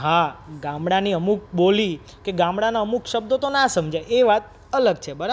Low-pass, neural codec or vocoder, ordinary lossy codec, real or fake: none; none; none; real